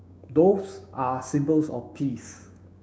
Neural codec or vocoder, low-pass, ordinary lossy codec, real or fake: codec, 16 kHz, 6 kbps, DAC; none; none; fake